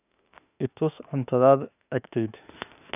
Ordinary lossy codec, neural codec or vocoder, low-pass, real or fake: none; autoencoder, 48 kHz, 32 numbers a frame, DAC-VAE, trained on Japanese speech; 3.6 kHz; fake